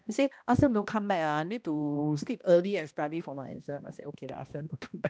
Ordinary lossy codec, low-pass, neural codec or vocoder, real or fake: none; none; codec, 16 kHz, 1 kbps, X-Codec, HuBERT features, trained on balanced general audio; fake